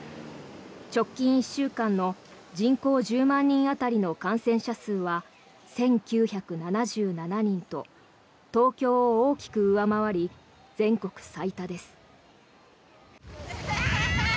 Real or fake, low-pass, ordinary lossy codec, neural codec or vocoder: real; none; none; none